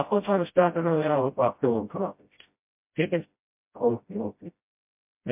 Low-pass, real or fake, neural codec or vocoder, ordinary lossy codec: 3.6 kHz; fake; codec, 16 kHz, 0.5 kbps, FreqCodec, smaller model; MP3, 32 kbps